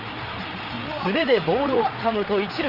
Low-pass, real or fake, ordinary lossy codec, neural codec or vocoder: 5.4 kHz; fake; Opus, 24 kbps; codec, 16 kHz, 8 kbps, FreqCodec, larger model